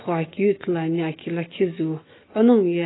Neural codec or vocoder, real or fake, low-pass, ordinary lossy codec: autoencoder, 48 kHz, 128 numbers a frame, DAC-VAE, trained on Japanese speech; fake; 7.2 kHz; AAC, 16 kbps